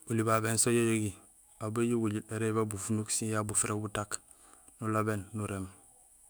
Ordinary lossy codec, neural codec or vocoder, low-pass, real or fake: none; autoencoder, 48 kHz, 128 numbers a frame, DAC-VAE, trained on Japanese speech; none; fake